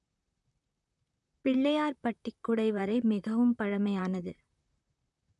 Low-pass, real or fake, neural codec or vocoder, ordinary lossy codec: 10.8 kHz; fake; vocoder, 44.1 kHz, 128 mel bands, Pupu-Vocoder; none